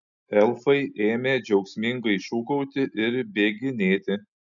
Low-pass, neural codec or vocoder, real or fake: 7.2 kHz; none; real